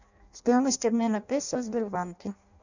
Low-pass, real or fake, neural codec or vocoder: 7.2 kHz; fake; codec, 16 kHz in and 24 kHz out, 0.6 kbps, FireRedTTS-2 codec